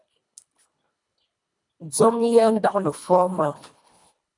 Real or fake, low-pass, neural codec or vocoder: fake; 10.8 kHz; codec, 24 kHz, 1.5 kbps, HILCodec